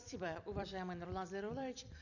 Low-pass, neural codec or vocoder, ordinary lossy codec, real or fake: 7.2 kHz; vocoder, 44.1 kHz, 128 mel bands every 256 samples, BigVGAN v2; none; fake